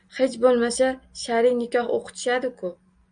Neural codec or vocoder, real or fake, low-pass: none; real; 9.9 kHz